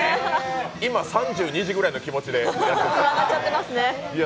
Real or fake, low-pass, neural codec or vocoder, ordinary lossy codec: real; none; none; none